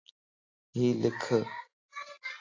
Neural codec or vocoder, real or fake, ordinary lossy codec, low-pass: none; real; AAC, 32 kbps; 7.2 kHz